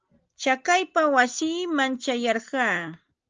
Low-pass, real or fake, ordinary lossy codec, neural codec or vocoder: 7.2 kHz; real; Opus, 24 kbps; none